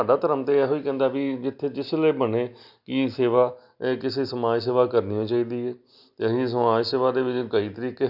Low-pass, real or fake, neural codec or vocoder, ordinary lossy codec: 5.4 kHz; real; none; AAC, 48 kbps